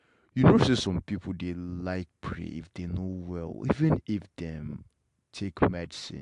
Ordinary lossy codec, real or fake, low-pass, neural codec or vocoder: AAC, 64 kbps; real; 10.8 kHz; none